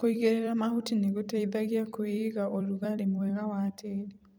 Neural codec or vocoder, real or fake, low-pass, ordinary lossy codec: vocoder, 44.1 kHz, 128 mel bands every 256 samples, BigVGAN v2; fake; none; none